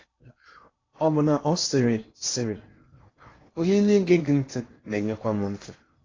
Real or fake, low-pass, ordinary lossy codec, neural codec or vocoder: fake; 7.2 kHz; AAC, 32 kbps; codec, 16 kHz in and 24 kHz out, 0.8 kbps, FocalCodec, streaming, 65536 codes